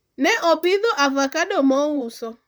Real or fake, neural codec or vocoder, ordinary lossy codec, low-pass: fake; vocoder, 44.1 kHz, 128 mel bands, Pupu-Vocoder; none; none